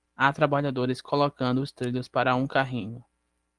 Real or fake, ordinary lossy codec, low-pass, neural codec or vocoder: real; Opus, 24 kbps; 10.8 kHz; none